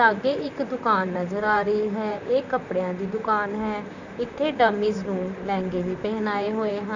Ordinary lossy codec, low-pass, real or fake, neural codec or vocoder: none; 7.2 kHz; fake; vocoder, 44.1 kHz, 128 mel bands, Pupu-Vocoder